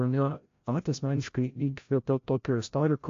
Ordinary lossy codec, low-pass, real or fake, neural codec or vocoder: AAC, 48 kbps; 7.2 kHz; fake; codec, 16 kHz, 0.5 kbps, FreqCodec, larger model